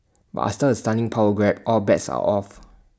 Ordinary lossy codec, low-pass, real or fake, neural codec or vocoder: none; none; real; none